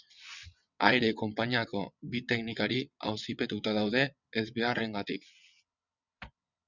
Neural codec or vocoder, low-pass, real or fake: vocoder, 22.05 kHz, 80 mel bands, WaveNeXt; 7.2 kHz; fake